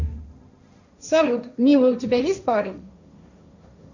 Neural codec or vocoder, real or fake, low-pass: codec, 16 kHz, 1.1 kbps, Voila-Tokenizer; fake; 7.2 kHz